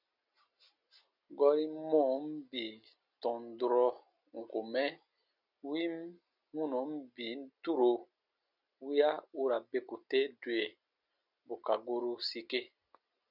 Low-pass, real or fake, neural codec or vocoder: 5.4 kHz; real; none